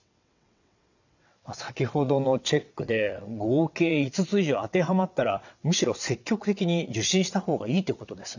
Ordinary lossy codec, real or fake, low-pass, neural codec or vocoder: none; fake; 7.2 kHz; vocoder, 22.05 kHz, 80 mel bands, WaveNeXt